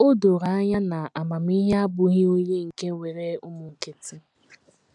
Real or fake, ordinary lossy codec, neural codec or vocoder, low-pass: real; none; none; 10.8 kHz